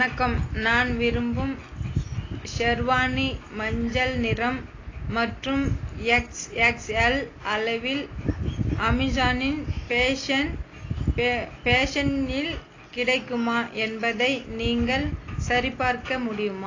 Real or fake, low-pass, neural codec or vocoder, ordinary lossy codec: real; 7.2 kHz; none; AAC, 32 kbps